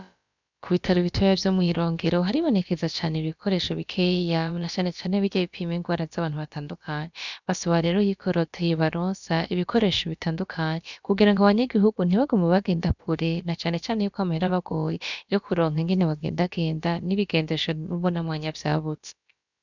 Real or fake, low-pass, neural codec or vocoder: fake; 7.2 kHz; codec, 16 kHz, about 1 kbps, DyCAST, with the encoder's durations